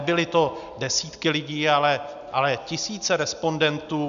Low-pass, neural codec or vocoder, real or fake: 7.2 kHz; none; real